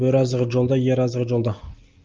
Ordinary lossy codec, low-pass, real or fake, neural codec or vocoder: Opus, 24 kbps; 7.2 kHz; real; none